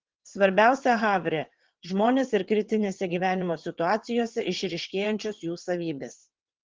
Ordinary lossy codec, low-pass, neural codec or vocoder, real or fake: Opus, 16 kbps; 7.2 kHz; vocoder, 22.05 kHz, 80 mel bands, WaveNeXt; fake